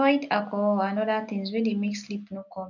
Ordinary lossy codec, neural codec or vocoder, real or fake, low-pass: none; none; real; 7.2 kHz